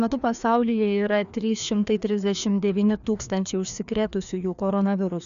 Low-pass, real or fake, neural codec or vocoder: 7.2 kHz; fake; codec, 16 kHz, 2 kbps, FreqCodec, larger model